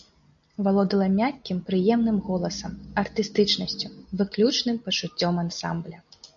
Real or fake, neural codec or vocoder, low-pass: real; none; 7.2 kHz